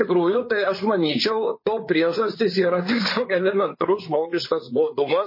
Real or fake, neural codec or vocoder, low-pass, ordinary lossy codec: fake; codec, 16 kHz, 4 kbps, FreqCodec, larger model; 5.4 kHz; MP3, 24 kbps